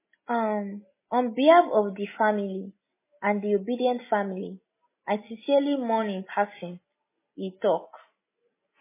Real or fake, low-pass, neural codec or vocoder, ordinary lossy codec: real; 3.6 kHz; none; MP3, 16 kbps